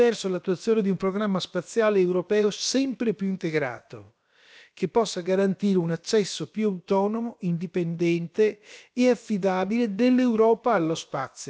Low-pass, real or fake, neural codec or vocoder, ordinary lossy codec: none; fake; codec, 16 kHz, about 1 kbps, DyCAST, with the encoder's durations; none